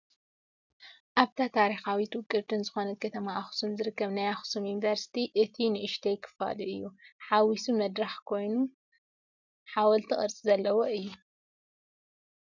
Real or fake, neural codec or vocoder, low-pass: real; none; 7.2 kHz